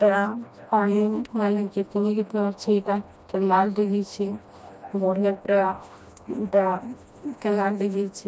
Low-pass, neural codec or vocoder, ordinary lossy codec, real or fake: none; codec, 16 kHz, 1 kbps, FreqCodec, smaller model; none; fake